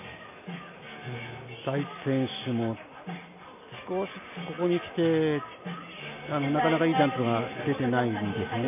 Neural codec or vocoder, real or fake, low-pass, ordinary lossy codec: none; real; 3.6 kHz; none